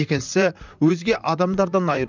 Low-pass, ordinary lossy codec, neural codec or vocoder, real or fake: 7.2 kHz; none; vocoder, 44.1 kHz, 128 mel bands, Pupu-Vocoder; fake